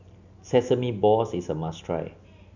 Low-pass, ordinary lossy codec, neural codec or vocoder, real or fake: 7.2 kHz; none; none; real